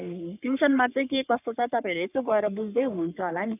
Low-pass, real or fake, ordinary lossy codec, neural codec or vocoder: 3.6 kHz; fake; none; codec, 44.1 kHz, 3.4 kbps, Pupu-Codec